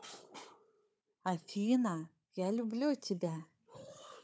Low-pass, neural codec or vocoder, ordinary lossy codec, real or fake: none; codec, 16 kHz, 4 kbps, FunCodec, trained on Chinese and English, 50 frames a second; none; fake